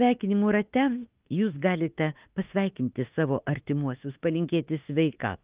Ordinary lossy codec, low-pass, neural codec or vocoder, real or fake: Opus, 32 kbps; 3.6 kHz; autoencoder, 48 kHz, 32 numbers a frame, DAC-VAE, trained on Japanese speech; fake